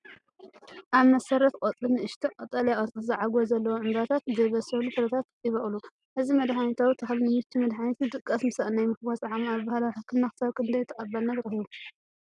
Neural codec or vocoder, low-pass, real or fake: none; 10.8 kHz; real